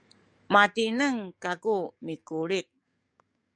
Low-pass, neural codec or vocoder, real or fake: 9.9 kHz; codec, 44.1 kHz, 7.8 kbps, DAC; fake